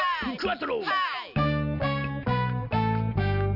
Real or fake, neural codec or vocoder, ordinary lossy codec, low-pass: real; none; none; 5.4 kHz